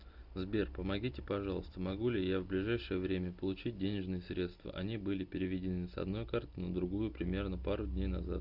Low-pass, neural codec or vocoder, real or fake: 5.4 kHz; none; real